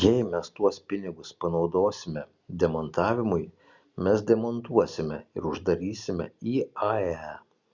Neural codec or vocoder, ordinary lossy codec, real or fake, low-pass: none; Opus, 64 kbps; real; 7.2 kHz